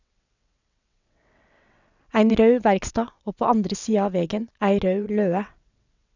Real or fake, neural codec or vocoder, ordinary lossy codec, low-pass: fake; vocoder, 22.05 kHz, 80 mel bands, WaveNeXt; none; 7.2 kHz